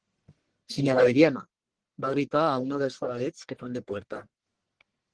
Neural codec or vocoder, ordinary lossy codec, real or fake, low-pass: codec, 44.1 kHz, 1.7 kbps, Pupu-Codec; Opus, 16 kbps; fake; 9.9 kHz